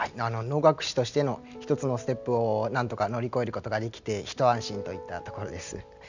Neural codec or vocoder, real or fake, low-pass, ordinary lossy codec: none; real; 7.2 kHz; none